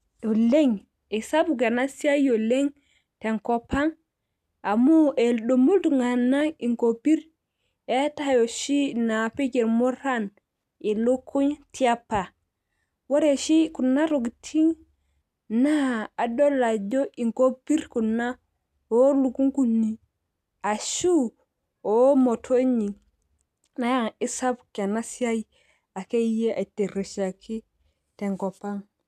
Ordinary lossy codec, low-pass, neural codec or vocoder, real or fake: none; 14.4 kHz; none; real